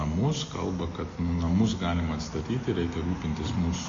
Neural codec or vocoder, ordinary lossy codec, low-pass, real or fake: none; AAC, 32 kbps; 7.2 kHz; real